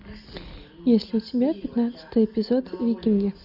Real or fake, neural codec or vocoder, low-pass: real; none; 5.4 kHz